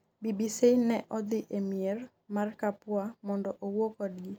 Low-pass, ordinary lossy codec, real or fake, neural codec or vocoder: none; none; real; none